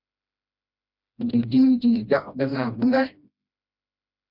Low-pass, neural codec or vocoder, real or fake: 5.4 kHz; codec, 16 kHz, 1 kbps, FreqCodec, smaller model; fake